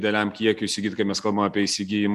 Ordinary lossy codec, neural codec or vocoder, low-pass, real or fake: MP3, 96 kbps; none; 14.4 kHz; real